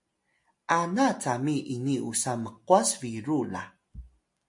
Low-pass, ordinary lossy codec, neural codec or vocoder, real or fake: 10.8 kHz; MP3, 48 kbps; none; real